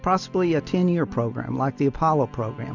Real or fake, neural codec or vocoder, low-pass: real; none; 7.2 kHz